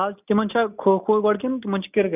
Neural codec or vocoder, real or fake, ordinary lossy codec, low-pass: none; real; none; 3.6 kHz